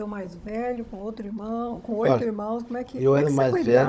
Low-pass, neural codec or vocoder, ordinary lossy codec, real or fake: none; codec, 16 kHz, 16 kbps, FunCodec, trained on Chinese and English, 50 frames a second; none; fake